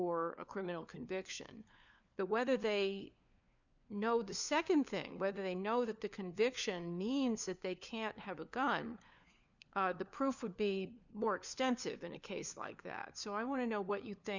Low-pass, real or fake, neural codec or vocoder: 7.2 kHz; fake; codec, 16 kHz, 4 kbps, FunCodec, trained on LibriTTS, 50 frames a second